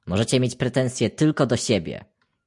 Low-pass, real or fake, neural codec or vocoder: 10.8 kHz; real; none